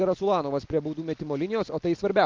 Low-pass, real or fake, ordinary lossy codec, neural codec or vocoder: 7.2 kHz; real; Opus, 32 kbps; none